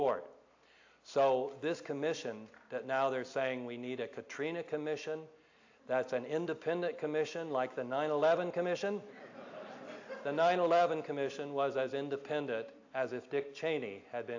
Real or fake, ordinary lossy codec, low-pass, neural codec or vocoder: real; AAC, 48 kbps; 7.2 kHz; none